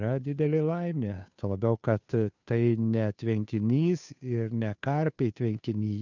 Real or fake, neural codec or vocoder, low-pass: fake; codec, 16 kHz, 2 kbps, FunCodec, trained on Chinese and English, 25 frames a second; 7.2 kHz